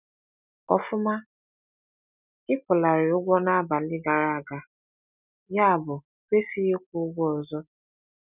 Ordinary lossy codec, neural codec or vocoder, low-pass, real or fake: none; none; 3.6 kHz; real